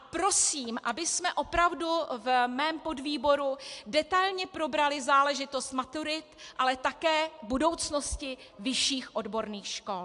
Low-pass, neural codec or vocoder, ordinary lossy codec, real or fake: 10.8 kHz; none; AAC, 64 kbps; real